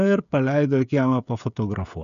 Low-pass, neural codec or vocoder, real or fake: 7.2 kHz; codec, 16 kHz, 16 kbps, FreqCodec, smaller model; fake